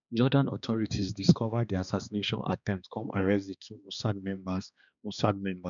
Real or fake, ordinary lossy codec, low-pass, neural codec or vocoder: fake; none; 7.2 kHz; codec, 16 kHz, 2 kbps, X-Codec, HuBERT features, trained on balanced general audio